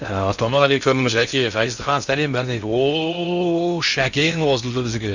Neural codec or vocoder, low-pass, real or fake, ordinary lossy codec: codec, 16 kHz in and 24 kHz out, 0.6 kbps, FocalCodec, streaming, 2048 codes; 7.2 kHz; fake; none